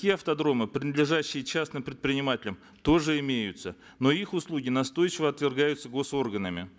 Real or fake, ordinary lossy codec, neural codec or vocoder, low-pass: real; none; none; none